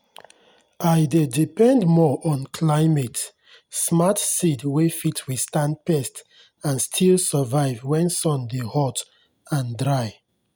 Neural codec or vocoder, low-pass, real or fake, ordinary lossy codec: none; none; real; none